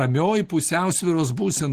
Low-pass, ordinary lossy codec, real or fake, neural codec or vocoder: 14.4 kHz; Opus, 16 kbps; real; none